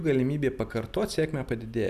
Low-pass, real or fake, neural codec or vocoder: 14.4 kHz; real; none